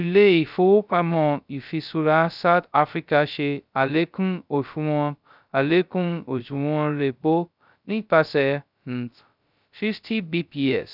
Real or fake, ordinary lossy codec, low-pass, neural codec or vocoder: fake; none; 5.4 kHz; codec, 16 kHz, 0.2 kbps, FocalCodec